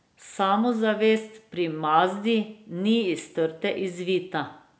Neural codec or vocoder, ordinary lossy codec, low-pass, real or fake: none; none; none; real